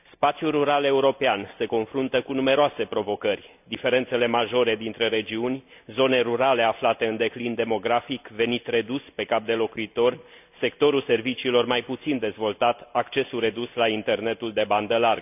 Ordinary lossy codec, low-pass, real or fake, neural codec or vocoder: none; 3.6 kHz; real; none